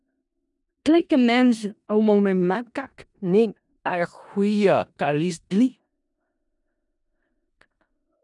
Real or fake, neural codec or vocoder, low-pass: fake; codec, 16 kHz in and 24 kHz out, 0.4 kbps, LongCat-Audio-Codec, four codebook decoder; 10.8 kHz